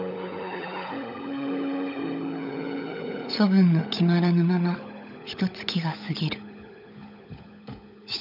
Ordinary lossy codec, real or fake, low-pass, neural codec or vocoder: none; fake; 5.4 kHz; codec, 16 kHz, 16 kbps, FunCodec, trained on LibriTTS, 50 frames a second